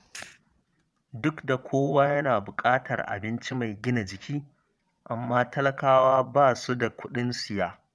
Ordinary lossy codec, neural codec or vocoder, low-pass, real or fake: none; vocoder, 22.05 kHz, 80 mel bands, Vocos; none; fake